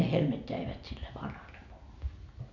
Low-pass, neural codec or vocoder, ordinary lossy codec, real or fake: 7.2 kHz; none; none; real